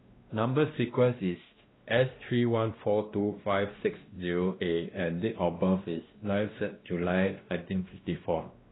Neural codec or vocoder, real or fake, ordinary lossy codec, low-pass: codec, 16 kHz, 1 kbps, X-Codec, WavLM features, trained on Multilingual LibriSpeech; fake; AAC, 16 kbps; 7.2 kHz